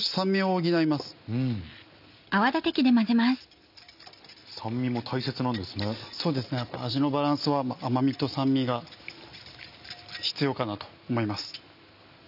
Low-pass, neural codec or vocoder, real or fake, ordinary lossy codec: 5.4 kHz; none; real; none